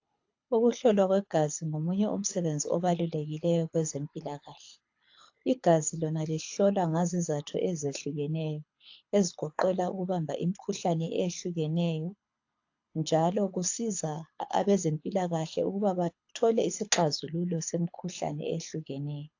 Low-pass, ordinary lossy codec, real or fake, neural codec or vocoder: 7.2 kHz; AAC, 48 kbps; fake; codec, 24 kHz, 6 kbps, HILCodec